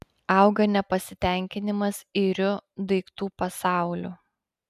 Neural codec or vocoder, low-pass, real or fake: none; 14.4 kHz; real